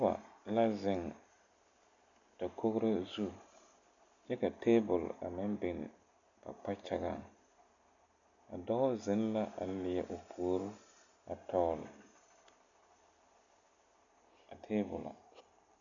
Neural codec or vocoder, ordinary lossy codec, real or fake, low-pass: none; MP3, 96 kbps; real; 7.2 kHz